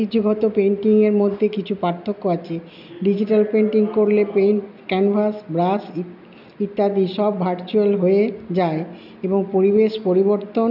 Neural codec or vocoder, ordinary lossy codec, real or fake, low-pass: none; none; real; 5.4 kHz